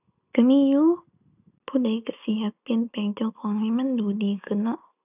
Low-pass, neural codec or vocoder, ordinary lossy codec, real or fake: 3.6 kHz; none; none; real